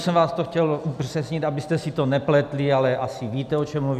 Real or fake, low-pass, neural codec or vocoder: real; 14.4 kHz; none